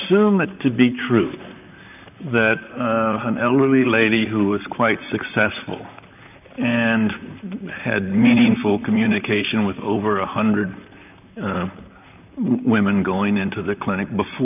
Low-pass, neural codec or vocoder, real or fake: 3.6 kHz; vocoder, 44.1 kHz, 128 mel bands, Pupu-Vocoder; fake